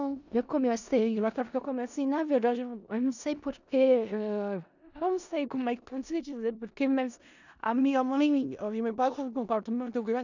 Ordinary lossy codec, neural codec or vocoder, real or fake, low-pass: none; codec, 16 kHz in and 24 kHz out, 0.4 kbps, LongCat-Audio-Codec, four codebook decoder; fake; 7.2 kHz